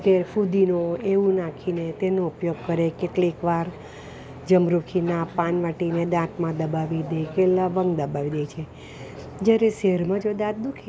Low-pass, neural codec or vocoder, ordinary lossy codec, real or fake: none; none; none; real